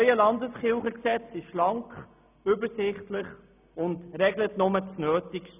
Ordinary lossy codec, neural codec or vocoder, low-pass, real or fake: none; none; 3.6 kHz; real